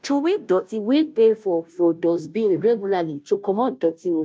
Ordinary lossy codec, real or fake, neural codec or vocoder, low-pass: none; fake; codec, 16 kHz, 0.5 kbps, FunCodec, trained on Chinese and English, 25 frames a second; none